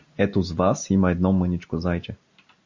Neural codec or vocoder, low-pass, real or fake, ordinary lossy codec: none; 7.2 kHz; real; MP3, 48 kbps